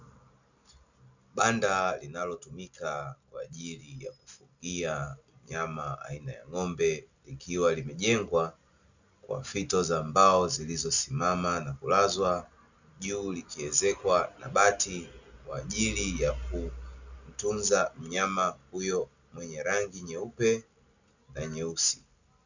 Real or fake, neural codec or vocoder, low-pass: real; none; 7.2 kHz